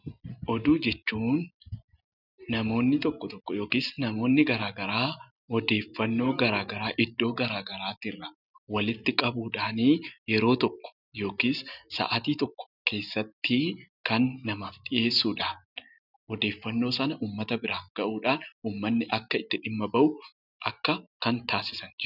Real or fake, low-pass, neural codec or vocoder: real; 5.4 kHz; none